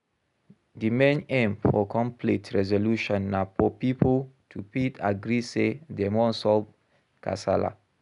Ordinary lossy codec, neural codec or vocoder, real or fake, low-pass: none; none; real; 10.8 kHz